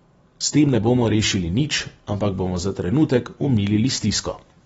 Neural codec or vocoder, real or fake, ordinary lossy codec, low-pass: none; real; AAC, 24 kbps; 19.8 kHz